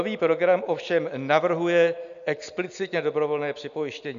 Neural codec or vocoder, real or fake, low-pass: none; real; 7.2 kHz